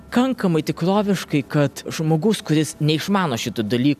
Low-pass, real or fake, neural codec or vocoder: 14.4 kHz; real; none